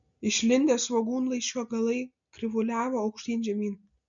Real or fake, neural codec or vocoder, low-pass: real; none; 7.2 kHz